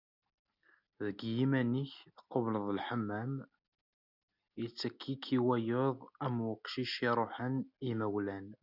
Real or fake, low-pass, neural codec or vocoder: real; 5.4 kHz; none